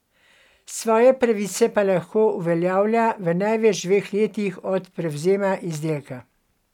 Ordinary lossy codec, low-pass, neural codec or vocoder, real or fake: none; 19.8 kHz; none; real